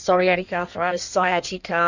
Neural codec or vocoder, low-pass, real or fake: codec, 16 kHz in and 24 kHz out, 1.1 kbps, FireRedTTS-2 codec; 7.2 kHz; fake